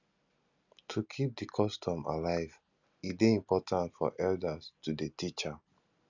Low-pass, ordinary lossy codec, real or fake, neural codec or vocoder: 7.2 kHz; none; real; none